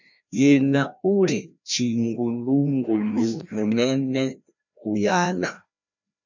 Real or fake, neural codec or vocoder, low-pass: fake; codec, 16 kHz, 1 kbps, FreqCodec, larger model; 7.2 kHz